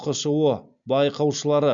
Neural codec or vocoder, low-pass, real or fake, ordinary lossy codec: none; 7.2 kHz; real; MP3, 64 kbps